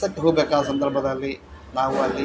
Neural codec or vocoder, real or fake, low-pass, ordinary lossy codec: none; real; none; none